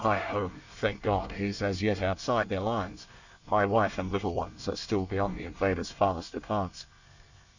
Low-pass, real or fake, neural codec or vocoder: 7.2 kHz; fake; codec, 24 kHz, 1 kbps, SNAC